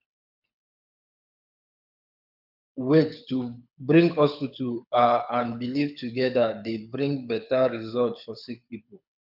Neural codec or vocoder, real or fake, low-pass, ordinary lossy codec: codec, 24 kHz, 6 kbps, HILCodec; fake; 5.4 kHz; none